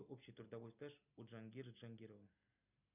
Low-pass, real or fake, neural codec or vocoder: 3.6 kHz; real; none